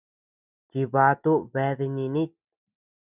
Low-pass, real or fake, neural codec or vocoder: 3.6 kHz; real; none